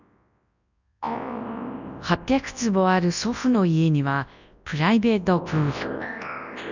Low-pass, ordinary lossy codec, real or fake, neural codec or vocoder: 7.2 kHz; none; fake; codec, 24 kHz, 0.9 kbps, WavTokenizer, large speech release